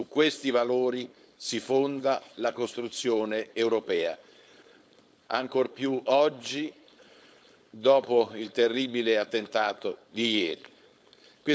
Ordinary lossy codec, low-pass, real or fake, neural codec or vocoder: none; none; fake; codec, 16 kHz, 4.8 kbps, FACodec